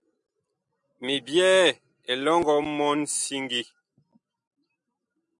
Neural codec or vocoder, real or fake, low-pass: none; real; 10.8 kHz